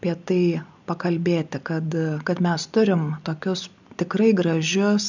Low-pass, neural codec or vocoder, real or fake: 7.2 kHz; none; real